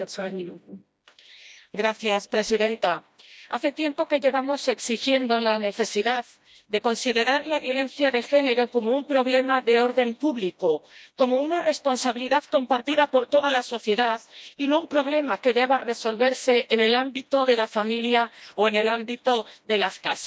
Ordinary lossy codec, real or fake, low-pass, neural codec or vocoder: none; fake; none; codec, 16 kHz, 1 kbps, FreqCodec, smaller model